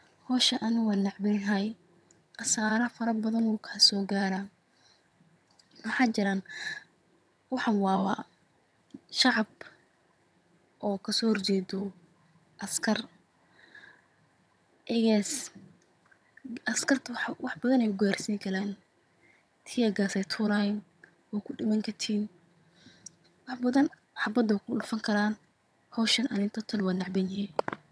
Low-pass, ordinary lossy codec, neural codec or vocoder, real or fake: none; none; vocoder, 22.05 kHz, 80 mel bands, HiFi-GAN; fake